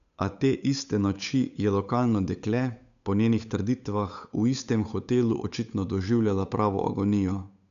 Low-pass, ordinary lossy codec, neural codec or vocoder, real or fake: 7.2 kHz; none; codec, 16 kHz, 8 kbps, FunCodec, trained on Chinese and English, 25 frames a second; fake